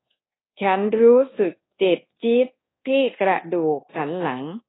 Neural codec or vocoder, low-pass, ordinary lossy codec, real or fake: codec, 24 kHz, 1.2 kbps, DualCodec; 7.2 kHz; AAC, 16 kbps; fake